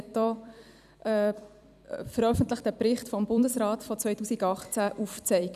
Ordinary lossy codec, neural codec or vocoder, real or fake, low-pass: none; vocoder, 44.1 kHz, 128 mel bands every 256 samples, BigVGAN v2; fake; 14.4 kHz